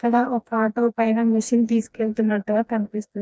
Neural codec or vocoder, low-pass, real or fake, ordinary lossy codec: codec, 16 kHz, 1 kbps, FreqCodec, smaller model; none; fake; none